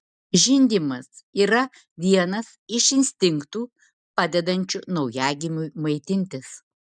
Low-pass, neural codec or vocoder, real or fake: 9.9 kHz; none; real